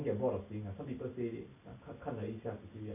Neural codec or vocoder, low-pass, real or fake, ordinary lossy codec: none; 3.6 kHz; real; MP3, 32 kbps